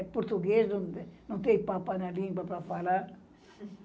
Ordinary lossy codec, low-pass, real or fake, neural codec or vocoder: none; none; real; none